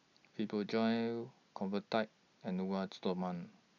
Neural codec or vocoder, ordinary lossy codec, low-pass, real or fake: none; none; 7.2 kHz; real